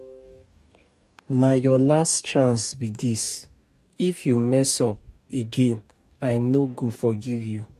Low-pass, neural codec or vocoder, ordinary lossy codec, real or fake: 14.4 kHz; codec, 44.1 kHz, 2.6 kbps, DAC; MP3, 96 kbps; fake